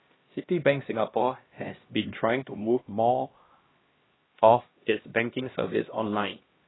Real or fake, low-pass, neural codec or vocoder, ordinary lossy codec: fake; 7.2 kHz; codec, 16 kHz, 1 kbps, X-Codec, HuBERT features, trained on LibriSpeech; AAC, 16 kbps